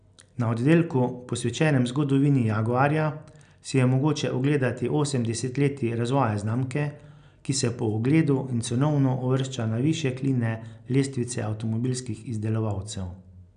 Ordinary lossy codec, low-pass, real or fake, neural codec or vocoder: none; 9.9 kHz; real; none